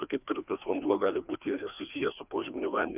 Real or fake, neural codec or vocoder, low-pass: fake; codec, 16 kHz, 2 kbps, FreqCodec, larger model; 3.6 kHz